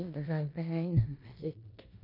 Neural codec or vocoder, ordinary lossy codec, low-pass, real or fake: codec, 16 kHz in and 24 kHz out, 0.9 kbps, LongCat-Audio-Codec, four codebook decoder; none; 5.4 kHz; fake